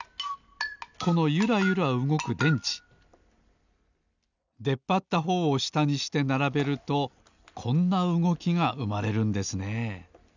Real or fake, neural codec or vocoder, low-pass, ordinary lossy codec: real; none; 7.2 kHz; none